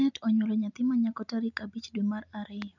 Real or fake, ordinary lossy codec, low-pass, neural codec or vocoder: real; none; 7.2 kHz; none